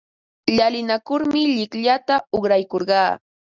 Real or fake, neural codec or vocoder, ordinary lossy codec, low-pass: real; none; Opus, 64 kbps; 7.2 kHz